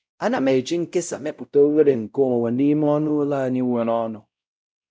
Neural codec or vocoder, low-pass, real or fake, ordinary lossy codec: codec, 16 kHz, 0.5 kbps, X-Codec, WavLM features, trained on Multilingual LibriSpeech; none; fake; none